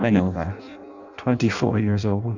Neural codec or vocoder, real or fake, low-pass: codec, 16 kHz in and 24 kHz out, 0.6 kbps, FireRedTTS-2 codec; fake; 7.2 kHz